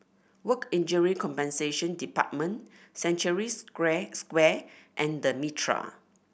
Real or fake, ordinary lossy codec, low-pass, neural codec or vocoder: real; none; none; none